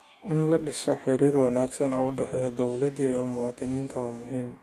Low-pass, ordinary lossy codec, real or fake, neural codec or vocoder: 14.4 kHz; none; fake; codec, 44.1 kHz, 2.6 kbps, DAC